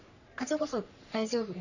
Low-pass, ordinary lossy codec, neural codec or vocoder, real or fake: 7.2 kHz; none; codec, 44.1 kHz, 3.4 kbps, Pupu-Codec; fake